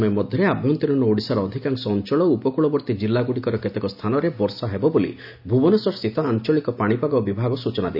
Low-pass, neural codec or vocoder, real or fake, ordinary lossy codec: 5.4 kHz; none; real; none